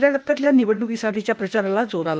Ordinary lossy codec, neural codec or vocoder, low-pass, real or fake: none; codec, 16 kHz, 0.8 kbps, ZipCodec; none; fake